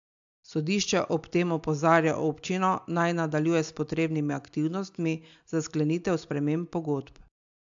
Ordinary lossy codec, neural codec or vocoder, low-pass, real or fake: AAC, 64 kbps; none; 7.2 kHz; real